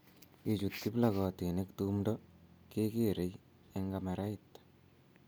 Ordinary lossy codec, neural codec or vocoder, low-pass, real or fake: none; none; none; real